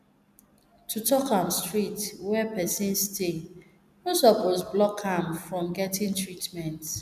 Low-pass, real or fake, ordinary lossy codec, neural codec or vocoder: 14.4 kHz; real; none; none